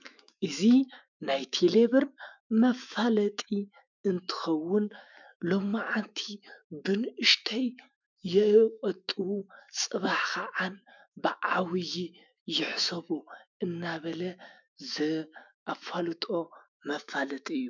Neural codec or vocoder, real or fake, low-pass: autoencoder, 48 kHz, 128 numbers a frame, DAC-VAE, trained on Japanese speech; fake; 7.2 kHz